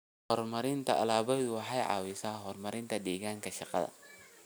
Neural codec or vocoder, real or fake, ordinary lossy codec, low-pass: none; real; none; none